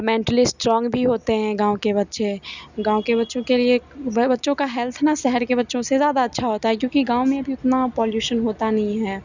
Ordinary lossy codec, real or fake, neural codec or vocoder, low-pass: none; real; none; 7.2 kHz